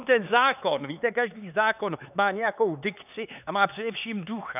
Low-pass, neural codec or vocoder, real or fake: 3.6 kHz; codec, 16 kHz, 4 kbps, X-Codec, HuBERT features, trained on LibriSpeech; fake